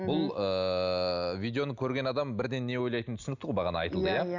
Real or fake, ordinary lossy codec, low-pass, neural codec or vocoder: real; none; 7.2 kHz; none